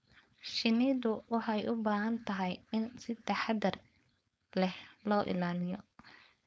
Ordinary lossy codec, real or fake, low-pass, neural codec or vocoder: none; fake; none; codec, 16 kHz, 4.8 kbps, FACodec